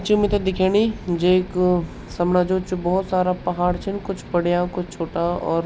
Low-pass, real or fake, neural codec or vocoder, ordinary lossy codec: none; real; none; none